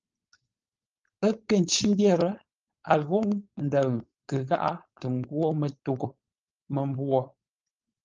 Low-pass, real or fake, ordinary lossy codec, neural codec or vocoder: 7.2 kHz; fake; Opus, 24 kbps; codec, 16 kHz, 4.8 kbps, FACodec